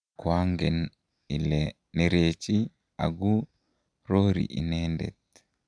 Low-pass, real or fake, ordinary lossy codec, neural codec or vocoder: 9.9 kHz; real; none; none